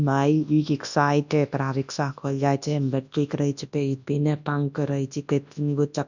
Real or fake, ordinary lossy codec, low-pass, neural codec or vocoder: fake; none; 7.2 kHz; codec, 24 kHz, 0.9 kbps, WavTokenizer, large speech release